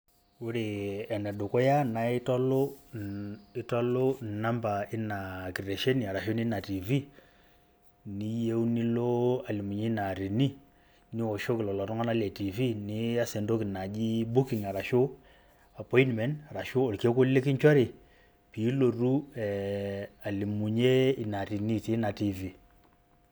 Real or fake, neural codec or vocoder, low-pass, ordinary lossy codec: real; none; none; none